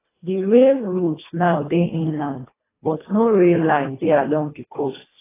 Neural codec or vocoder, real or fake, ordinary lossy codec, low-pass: codec, 24 kHz, 1.5 kbps, HILCodec; fake; AAC, 16 kbps; 3.6 kHz